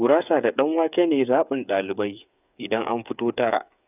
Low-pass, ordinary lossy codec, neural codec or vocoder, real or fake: 3.6 kHz; none; codec, 16 kHz, 8 kbps, FreqCodec, smaller model; fake